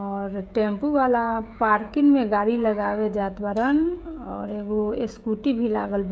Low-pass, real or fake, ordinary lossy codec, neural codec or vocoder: none; fake; none; codec, 16 kHz, 16 kbps, FreqCodec, smaller model